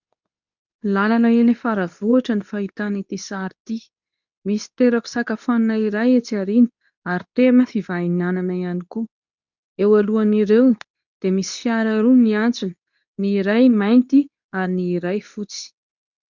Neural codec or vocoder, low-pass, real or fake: codec, 24 kHz, 0.9 kbps, WavTokenizer, medium speech release version 2; 7.2 kHz; fake